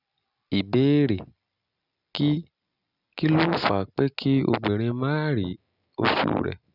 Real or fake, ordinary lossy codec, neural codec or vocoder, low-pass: real; none; none; 5.4 kHz